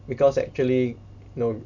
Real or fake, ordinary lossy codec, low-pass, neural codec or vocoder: real; none; 7.2 kHz; none